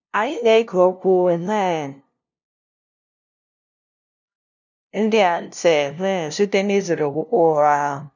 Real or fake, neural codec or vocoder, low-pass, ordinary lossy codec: fake; codec, 16 kHz, 0.5 kbps, FunCodec, trained on LibriTTS, 25 frames a second; 7.2 kHz; none